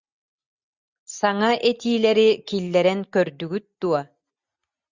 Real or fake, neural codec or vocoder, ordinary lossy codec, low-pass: real; none; Opus, 64 kbps; 7.2 kHz